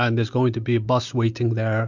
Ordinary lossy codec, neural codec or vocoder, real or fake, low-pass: MP3, 64 kbps; none; real; 7.2 kHz